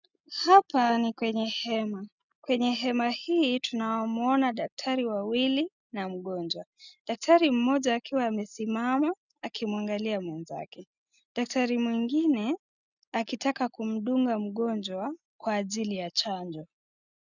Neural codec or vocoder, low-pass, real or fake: none; 7.2 kHz; real